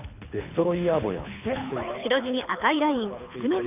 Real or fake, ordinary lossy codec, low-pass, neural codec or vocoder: fake; none; 3.6 kHz; codec, 24 kHz, 6 kbps, HILCodec